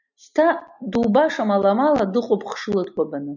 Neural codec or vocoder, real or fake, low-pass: none; real; 7.2 kHz